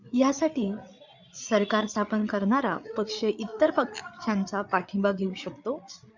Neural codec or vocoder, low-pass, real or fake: codec, 16 kHz, 8 kbps, FreqCodec, larger model; 7.2 kHz; fake